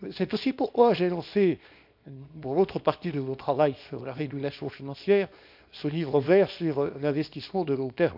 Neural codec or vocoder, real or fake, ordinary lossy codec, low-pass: codec, 24 kHz, 0.9 kbps, WavTokenizer, small release; fake; none; 5.4 kHz